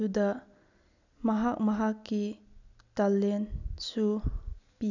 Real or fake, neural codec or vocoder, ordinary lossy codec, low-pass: real; none; none; 7.2 kHz